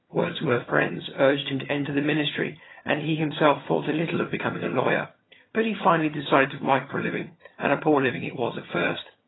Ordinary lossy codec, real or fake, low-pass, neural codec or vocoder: AAC, 16 kbps; fake; 7.2 kHz; vocoder, 22.05 kHz, 80 mel bands, HiFi-GAN